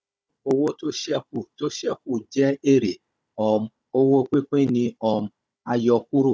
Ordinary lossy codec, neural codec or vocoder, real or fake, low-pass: none; codec, 16 kHz, 16 kbps, FunCodec, trained on Chinese and English, 50 frames a second; fake; none